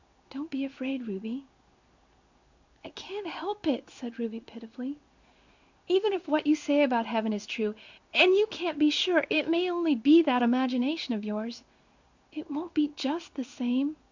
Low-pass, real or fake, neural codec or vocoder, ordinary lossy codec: 7.2 kHz; fake; codec, 16 kHz in and 24 kHz out, 1 kbps, XY-Tokenizer; Opus, 64 kbps